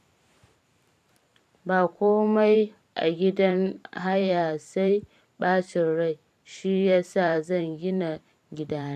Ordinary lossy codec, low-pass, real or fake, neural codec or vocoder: AAC, 96 kbps; 14.4 kHz; fake; vocoder, 44.1 kHz, 128 mel bands every 512 samples, BigVGAN v2